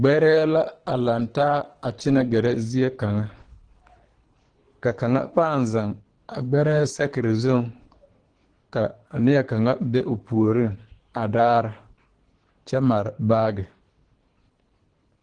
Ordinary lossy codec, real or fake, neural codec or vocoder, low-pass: Opus, 16 kbps; fake; codec, 24 kHz, 3 kbps, HILCodec; 9.9 kHz